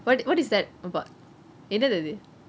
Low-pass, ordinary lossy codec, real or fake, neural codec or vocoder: none; none; real; none